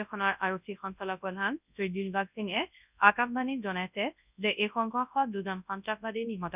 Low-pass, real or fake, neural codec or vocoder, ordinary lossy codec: 3.6 kHz; fake; codec, 24 kHz, 0.9 kbps, WavTokenizer, large speech release; none